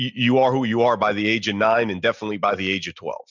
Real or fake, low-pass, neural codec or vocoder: real; 7.2 kHz; none